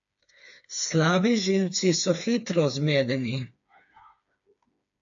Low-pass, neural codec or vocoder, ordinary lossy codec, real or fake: 7.2 kHz; codec, 16 kHz, 4 kbps, FreqCodec, smaller model; AAC, 64 kbps; fake